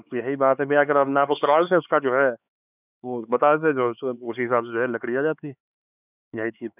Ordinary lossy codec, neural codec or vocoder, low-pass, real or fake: none; codec, 16 kHz, 4 kbps, X-Codec, HuBERT features, trained on LibriSpeech; 3.6 kHz; fake